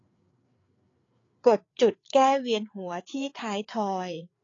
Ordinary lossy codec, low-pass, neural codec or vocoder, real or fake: AAC, 32 kbps; 7.2 kHz; codec, 16 kHz, 4 kbps, FreqCodec, larger model; fake